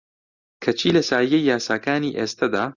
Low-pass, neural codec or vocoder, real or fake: 7.2 kHz; none; real